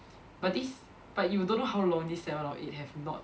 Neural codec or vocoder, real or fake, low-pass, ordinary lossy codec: none; real; none; none